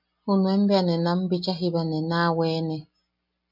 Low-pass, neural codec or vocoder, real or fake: 5.4 kHz; none; real